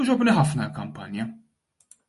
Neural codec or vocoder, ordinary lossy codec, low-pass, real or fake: none; MP3, 48 kbps; 14.4 kHz; real